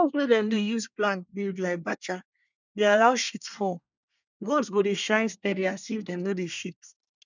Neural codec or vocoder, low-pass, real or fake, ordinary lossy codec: codec, 24 kHz, 1 kbps, SNAC; 7.2 kHz; fake; none